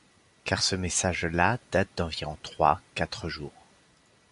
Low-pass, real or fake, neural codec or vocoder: 10.8 kHz; real; none